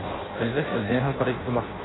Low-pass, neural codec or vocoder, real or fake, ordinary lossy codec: 7.2 kHz; codec, 16 kHz in and 24 kHz out, 0.6 kbps, FireRedTTS-2 codec; fake; AAC, 16 kbps